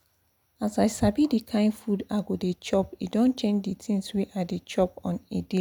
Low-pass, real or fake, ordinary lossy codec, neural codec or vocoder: 19.8 kHz; real; none; none